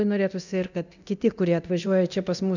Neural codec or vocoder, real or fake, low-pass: codec, 24 kHz, 0.9 kbps, DualCodec; fake; 7.2 kHz